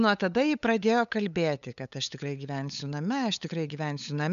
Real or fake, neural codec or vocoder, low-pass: fake; codec, 16 kHz, 8 kbps, FunCodec, trained on LibriTTS, 25 frames a second; 7.2 kHz